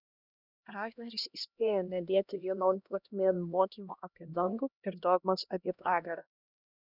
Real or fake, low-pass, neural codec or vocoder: fake; 5.4 kHz; codec, 16 kHz, 1 kbps, X-Codec, HuBERT features, trained on LibriSpeech